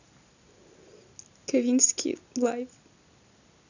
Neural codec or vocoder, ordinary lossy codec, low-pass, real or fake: none; none; 7.2 kHz; real